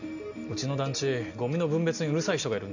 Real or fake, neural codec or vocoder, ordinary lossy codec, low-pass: real; none; none; 7.2 kHz